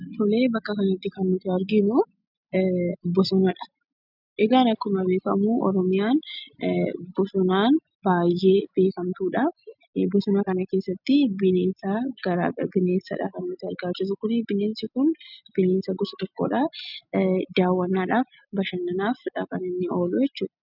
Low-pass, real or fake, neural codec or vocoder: 5.4 kHz; real; none